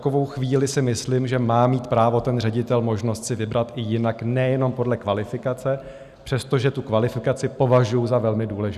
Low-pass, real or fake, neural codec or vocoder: 14.4 kHz; real; none